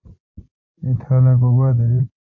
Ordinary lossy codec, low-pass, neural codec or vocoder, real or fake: AAC, 48 kbps; 7.2 kHz; vocoder, 44.1 kHz, 128 mel bands every 256 samples, BigVGAN v2; fake